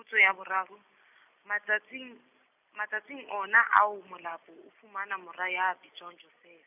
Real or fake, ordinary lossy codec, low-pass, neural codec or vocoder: real; none; 3.6 kHz; none